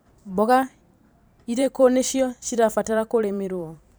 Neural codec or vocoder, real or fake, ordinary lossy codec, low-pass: vocoder, 44.1 kHz, 128 mel bands every 512 samples, BigVGAN v2; fake; none; none